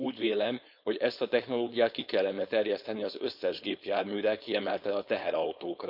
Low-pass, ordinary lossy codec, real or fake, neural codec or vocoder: 5.4 kHz; none; fake; codec, 16 kHz, 4.8 kbps, FACodec